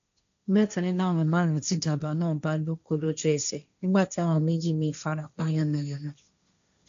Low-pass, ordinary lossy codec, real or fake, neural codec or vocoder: 7.2 kHz; AAC, 64 kbps; fake; codec, 16 kHz, 1.1 kbps, Voila-Tokenizer